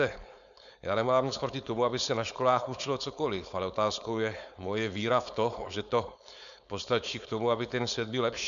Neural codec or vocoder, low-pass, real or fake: codec, 16 kHz, 4.8 kbps, FACodec; 7.2 kHz; fake